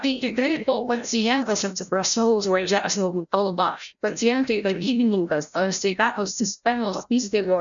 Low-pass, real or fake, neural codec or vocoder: 7.2 kHz; fake; codec, 16 kHz, 0.5 kbps, FreqCodec, larger model